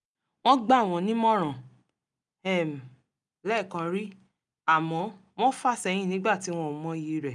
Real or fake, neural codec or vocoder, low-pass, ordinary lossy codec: real; none; 10.8 kHz; none